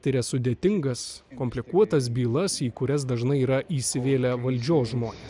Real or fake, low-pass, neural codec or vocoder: real; 10.8 kHz; none